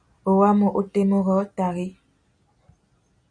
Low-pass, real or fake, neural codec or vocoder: 9.9 kHz; real; none